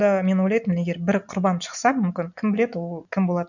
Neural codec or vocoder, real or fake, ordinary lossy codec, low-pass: none; real; none; 7.2 kHz